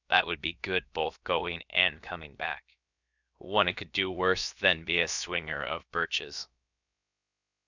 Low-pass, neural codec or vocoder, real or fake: 7.2 kHz; codec, 16 kHz, about 1 kbps, DyCAST, with the encoder's durations; fake